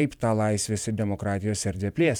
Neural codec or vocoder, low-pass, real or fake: vocoder, 44.1 kHz, 128 mel bands every 512 samples, BigVGAN v2; 19.8 kHz; fake